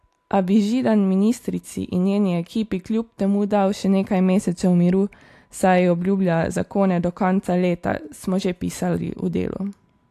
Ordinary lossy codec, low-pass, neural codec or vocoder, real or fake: AAC, 64 kbps; 14.4 kHz; none; real